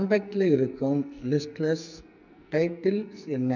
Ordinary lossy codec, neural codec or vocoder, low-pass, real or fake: none; codec, 44.1 kHz, 2.6 kbps, SNAC; 7.2 kHz; fake